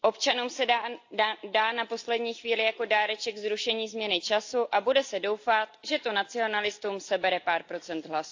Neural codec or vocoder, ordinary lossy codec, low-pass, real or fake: none; AAC, 48 kbps; 7.2 kHz; real